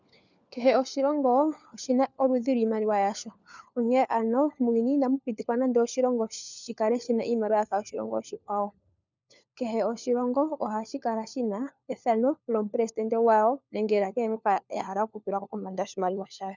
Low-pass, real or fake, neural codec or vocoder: 7.2 kHz; fake; codec, 16 kHz, 4 kbps, FunCodec, trained on LibriTTS, 50 frames a second